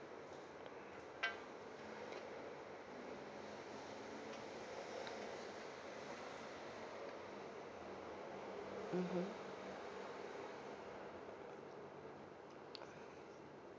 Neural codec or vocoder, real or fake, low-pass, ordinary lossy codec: none; real; none; none